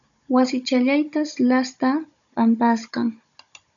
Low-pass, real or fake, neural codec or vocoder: 7.2 kHz; fake; codec, 16 kHz, 16 kbps, FunCodec, trained on Chinese and English, 50 frames a second